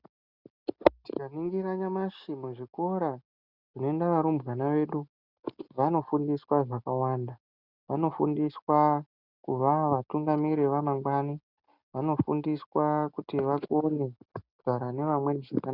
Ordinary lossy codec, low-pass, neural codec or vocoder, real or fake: Opus, 64 kbps; 5.4 kHz; none; real